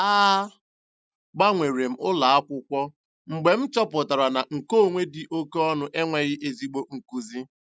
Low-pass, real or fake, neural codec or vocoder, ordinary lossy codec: none; real; none; none